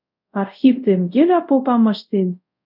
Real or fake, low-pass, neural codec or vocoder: fake; 5.4 kHz; codec, 24 kHz, 0.5 kbps, DualCodec